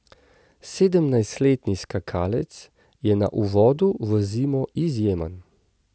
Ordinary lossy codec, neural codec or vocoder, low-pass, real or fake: none; none; none; real